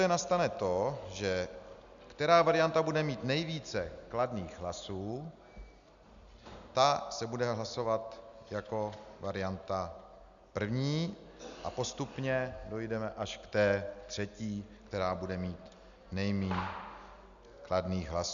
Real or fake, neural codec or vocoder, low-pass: real; none; 7.2 kHz